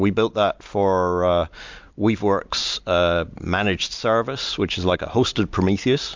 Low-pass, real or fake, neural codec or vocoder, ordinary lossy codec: 7.2 kHz; real; none; MP3, 64 kbps